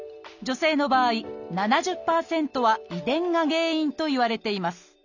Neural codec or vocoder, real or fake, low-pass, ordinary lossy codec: none; real; 7.2 kHz; none